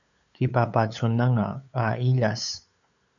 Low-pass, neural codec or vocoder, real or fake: 7.2 kHz; codec, 16 kHz, 8 kbps, FunCodec, trained on LibriTTS, 25 frames a second; fake